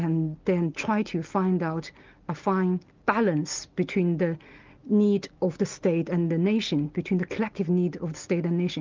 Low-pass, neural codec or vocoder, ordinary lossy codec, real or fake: 7.2 kHz; none; Opus, 24 kbps; real